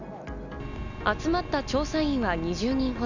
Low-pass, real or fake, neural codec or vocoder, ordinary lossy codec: 7.2 kHz; real; none; none